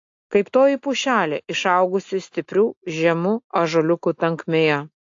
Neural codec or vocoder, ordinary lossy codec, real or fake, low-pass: none; AAC, 48 kbps; real; 7.2 kHz